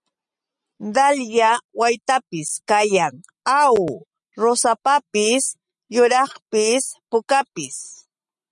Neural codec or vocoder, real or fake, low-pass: none; real; 10.8 kHz